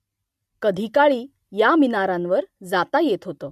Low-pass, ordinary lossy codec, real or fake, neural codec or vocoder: 14.4 kHz; MP3, 64 kbps; real; none